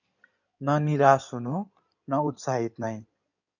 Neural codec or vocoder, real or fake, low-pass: codec, 16 kHz in and 24 kHz out, 2.2 kbps, FireRedTTS-2 codec; fake; 7.2 kHz